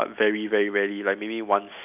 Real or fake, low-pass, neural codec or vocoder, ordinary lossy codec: real; 3.6 kHz; none; none